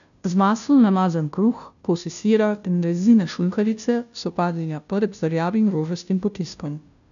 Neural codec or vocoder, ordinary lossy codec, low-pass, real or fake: codec, 16 kHz, 0.5 kbps, FunCodec, trained on Chinese and English, 25 frames a second; none; 7.2 kHz; fake